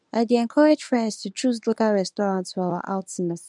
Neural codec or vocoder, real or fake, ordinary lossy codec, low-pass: codec, 24 kHz, 0.9 kbps, WavTokenizer, medium speech release version 1; fake; none; 10.8 kHz